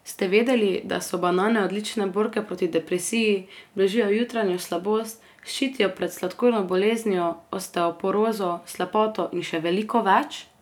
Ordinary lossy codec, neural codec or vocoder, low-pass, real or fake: none; none; 19.8 kHz; real